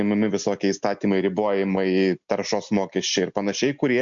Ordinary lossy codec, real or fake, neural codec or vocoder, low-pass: MP3, 64 kbps; real; none; 7.2 kHz